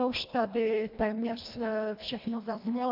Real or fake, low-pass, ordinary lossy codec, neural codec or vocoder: fake; 5.4 kHz; MP3, 48 kbps; codec, 24 kHz, 1.5 kbps, HILCodec